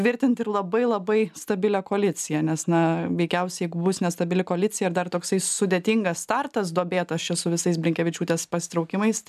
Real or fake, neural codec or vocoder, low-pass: real; none; 14.4 kHz